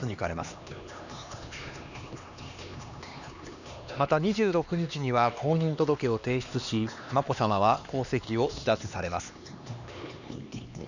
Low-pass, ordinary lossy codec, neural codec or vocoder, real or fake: 7.2 kHz; none; codec, 16 kHz, 2 kbps, X-Codec, HuBERT features, trained on LibriSpeech; fake